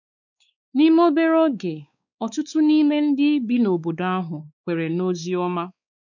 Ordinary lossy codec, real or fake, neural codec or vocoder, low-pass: none; fake; codec, 16 kHz, 4 kbps, X-Codec, WavLM features, trained on Multilingual LibriSpeech; 7.2 kHz